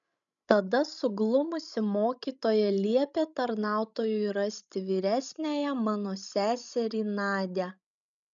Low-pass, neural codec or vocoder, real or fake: 7.2 kHz; codec, 16 kHz, 16 kbps, FreqCodec, larger model; fake